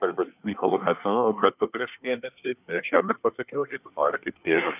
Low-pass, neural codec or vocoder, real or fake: 3.6 kHz; codec, 24 kHz, 1 kbps, SNAC; fake